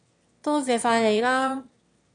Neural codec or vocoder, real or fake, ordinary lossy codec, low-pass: autoencoder, 22.05 kHz, a latent of 192 numbers a frame, VITS, trained on one speaker; fake; MP3, 64 kbps; 9.9 kHz